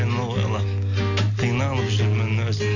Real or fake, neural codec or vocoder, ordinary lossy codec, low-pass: real; none; none; 7.2 kHz